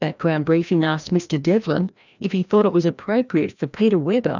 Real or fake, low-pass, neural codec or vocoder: fake; 7.2 kHz; codec, 16 kHz, 1 kbps, FreqCodec, larger model